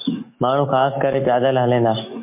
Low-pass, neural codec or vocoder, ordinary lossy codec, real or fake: 3.6 kHz; autoencoder, 48 kHz, 128 numbers a frame, DAC-VAE, trained on Japanese speech; MP3, 24 kbps; fake